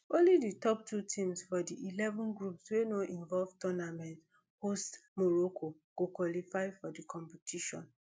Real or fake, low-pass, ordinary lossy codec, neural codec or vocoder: real; none; none; none